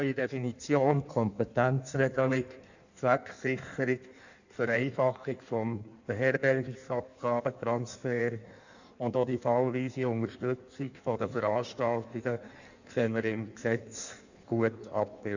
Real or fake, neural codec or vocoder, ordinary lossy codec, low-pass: fake; codec, 16 kHz in and 24 kHz out, 1.1 kbps, FireRedTTS-2 codec; none; 7.2 kHz